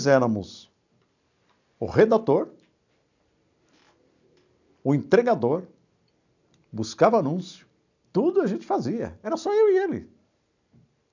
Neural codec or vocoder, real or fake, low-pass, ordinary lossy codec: none; real; 7.2 kHz; none